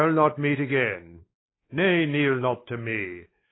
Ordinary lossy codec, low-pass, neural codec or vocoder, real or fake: AAC, 16 kbps; 7.2 kHz; codec, 16 kHz, 4 kbps, X-Codec, WavLM features, trained on Multilingual LibriSpeech; fake